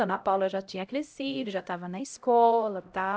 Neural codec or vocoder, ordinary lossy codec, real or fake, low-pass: codec, 16 kHz, 0.5 kbps, X-Codec, HuBERT features, trained on LibriSpeech; none; fake; none